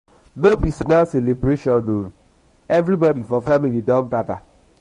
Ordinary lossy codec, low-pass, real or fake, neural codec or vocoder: MP3, 48 kbps; 10.8 kHz; fake; codec, 24 kHz, 0.9 kbps, WavTokenizer, medium speech release version 1